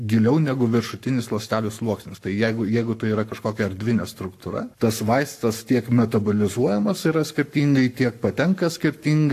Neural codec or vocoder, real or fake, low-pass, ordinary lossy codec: codec, 44.1 kHz, 7.8 kbps, Pupu-Codec; fake; 14.4 kHz; AAC, 64 kbps